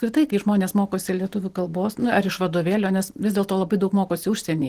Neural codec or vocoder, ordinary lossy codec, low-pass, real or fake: none; Opus, 16 kbps; 14.4 kHz; real